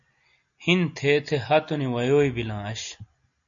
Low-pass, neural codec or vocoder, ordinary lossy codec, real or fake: 7.2 kHz; none; MP3, 64 kbps; real